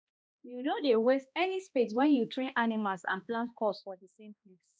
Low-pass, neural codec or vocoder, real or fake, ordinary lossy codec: none; codec, 16 kHz, 1 kbps, X-Codec, HuBERT features, trained on balanced general audio; fake; none